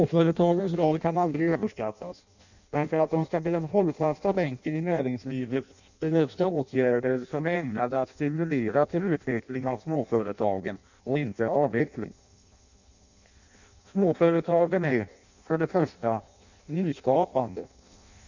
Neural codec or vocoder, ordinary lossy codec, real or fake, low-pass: codec, 16 kHz in and 24 kHz out, 0.6 kbps, FireRedTTS-2 codec; none; fake; 7.2 kHz